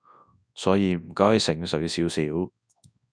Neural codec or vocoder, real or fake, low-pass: codec, 24 kHz, 0.9 kbps, WavTokenizer, large speech release; fake; 9.9 kHz